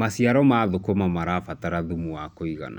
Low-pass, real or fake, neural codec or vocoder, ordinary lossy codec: 19.8 kHz; real; none; none